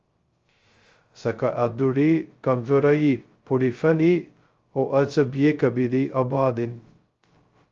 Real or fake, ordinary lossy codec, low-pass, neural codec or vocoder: fake; Opus, 32 kbps; 7.2 kHz; codec, 16 kHz, 0.2 kbps, FocalCodec